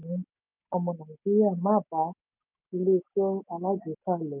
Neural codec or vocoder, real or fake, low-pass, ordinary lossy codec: none; real; 3.6 kHz; none